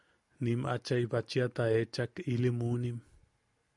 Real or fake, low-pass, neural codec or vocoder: real; 10.8 kHz; none